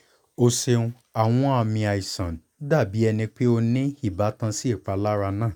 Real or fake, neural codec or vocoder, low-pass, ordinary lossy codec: real; none; 19.8 kHz; none